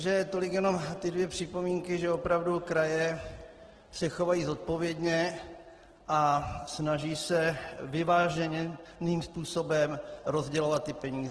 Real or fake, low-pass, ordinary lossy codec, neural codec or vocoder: real; 10.8 kHz; Opus, 16 kbps; none